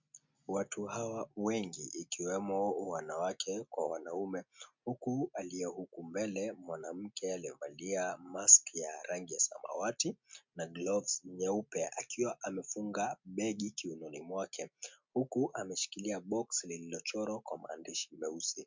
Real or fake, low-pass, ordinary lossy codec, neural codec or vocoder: real; 7.2 kHz; MP3, 64 kbps; none